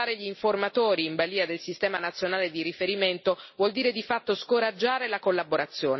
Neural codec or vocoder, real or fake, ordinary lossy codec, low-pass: none; real; MP3, 24 kbps; 7.2 kHz